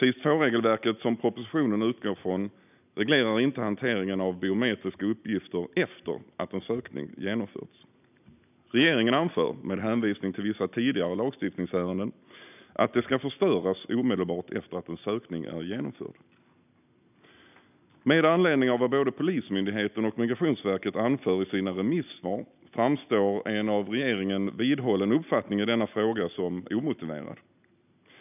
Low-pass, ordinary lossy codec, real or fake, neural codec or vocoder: 3.6 kHz; none; real; none